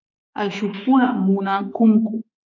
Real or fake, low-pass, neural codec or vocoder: fake; 7.2 kHz; autoencoder, 48 kHz, 32 numbers a frame, DAC-VAE, trained on Japanese speech